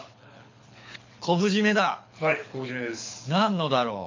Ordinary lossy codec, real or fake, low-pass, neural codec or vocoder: MP3, 48 kbps; fake; 7.2 kHz; codec, 24 kHz, 6 kbps, HILCodec